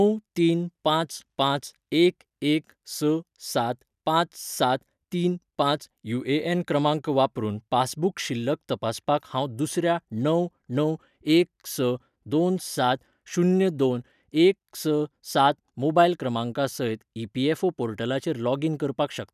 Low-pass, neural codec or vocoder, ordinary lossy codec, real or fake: 14.4 kHz; none; none; real